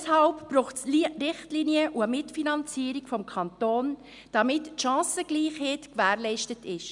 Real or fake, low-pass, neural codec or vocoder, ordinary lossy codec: real; 10.8 kHz; none; MP3, 96 kbps